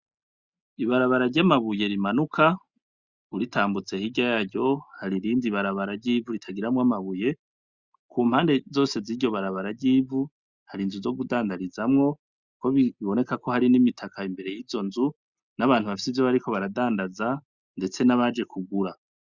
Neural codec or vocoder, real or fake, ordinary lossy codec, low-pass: none; real; Opus, 64 kbps; 7.2 kHz